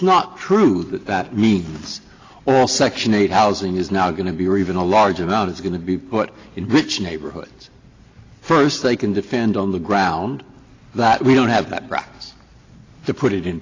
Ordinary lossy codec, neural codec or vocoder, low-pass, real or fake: AAC, 32 kbps; none; 7.2 kHz; real